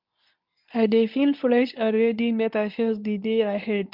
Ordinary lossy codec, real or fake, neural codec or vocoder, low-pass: MP3, 48 kbps; fake; codec, 24 kHz, 0.9 kbps, WavTokenizer, medium speech release version 2; 5.4 kHz